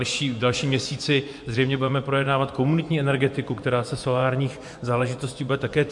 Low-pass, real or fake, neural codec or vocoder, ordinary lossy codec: 10.8 kHz; fake; vocoder, 24 kHz, 100 mel bands, Vocos; MP3, 64 kbps